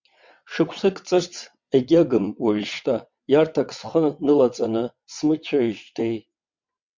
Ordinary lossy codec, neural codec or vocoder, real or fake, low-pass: MP3, 64 kbps; vocoder, 22.05 kHz, 80 mel bands, WaveNeXt; fake; 7.2 kHz